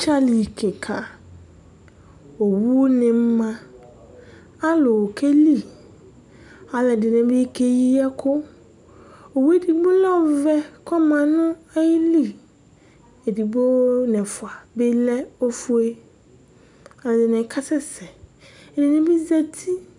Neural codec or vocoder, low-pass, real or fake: none; 10.8 kHz; real